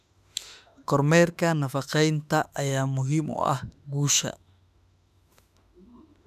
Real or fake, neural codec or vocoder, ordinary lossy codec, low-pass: fake; autoencoder, 48 kHz, 32 numbers a frame, DAC-VAE, trained on Japanese speech; none; 14.4 kHz